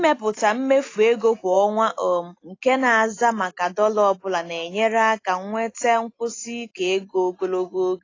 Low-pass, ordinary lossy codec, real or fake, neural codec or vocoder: 7.2 kHz; AAC, 32 kbps; real; none